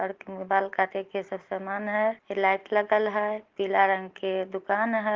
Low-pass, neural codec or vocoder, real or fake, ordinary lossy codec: 7.2 kHz; none; real; Opus, 16 kbps